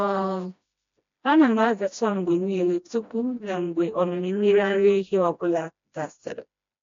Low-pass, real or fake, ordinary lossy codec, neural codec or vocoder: 7.2 kHz; fake; AAC, 48 kbps; codec, 16 kHz, 1 kbps, FreqCodec, smaller model